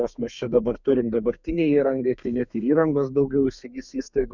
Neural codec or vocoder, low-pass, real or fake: codec, 32 kHz, 1.9 kbps, SNAC; 7.2 kHz; fake